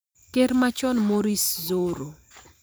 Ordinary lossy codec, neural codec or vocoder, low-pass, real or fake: none; vocoder, 44.1 kHz, 128 mel bands every 512 samples, BigVGAN v2; none; fake